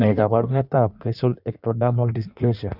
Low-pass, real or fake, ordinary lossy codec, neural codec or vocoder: 5.4 kHz; fake; none; codec, 16 kHz in and 24 kHz out, 1.1 kbps, FireRedTTS-2 codec